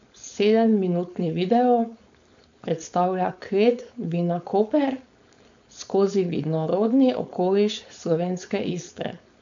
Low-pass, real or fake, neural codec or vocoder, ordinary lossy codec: 7.2 kHz; fake; codec, 16 kHz, 4.8 kbps, FACodec; AAC, 64 kbps